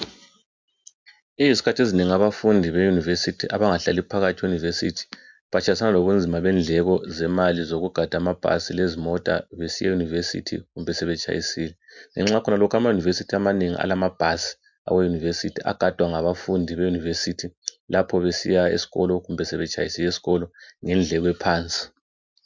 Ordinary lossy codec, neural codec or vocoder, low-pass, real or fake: MP3, 64 kbps; none; 7.2 kHz; real